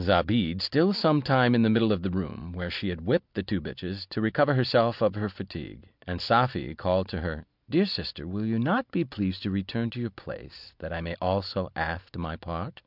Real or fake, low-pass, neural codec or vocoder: real; 5.4 kHz; none